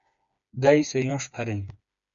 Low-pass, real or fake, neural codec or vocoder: 7.2 kHz; fake; codec, 16 kHz, 4 kbps, FreqCodec, smaller model